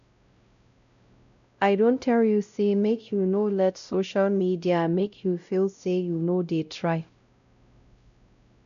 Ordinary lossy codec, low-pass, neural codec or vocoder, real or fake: none; 7.2 kHz; codec, 16 kHz, 0.5 kbps, X-Codec, WavLM features, trained on Multilingual LibriSpeech; fake